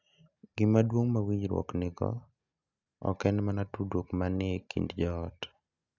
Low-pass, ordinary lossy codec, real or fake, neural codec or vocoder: 7.2 kHz; none; real; none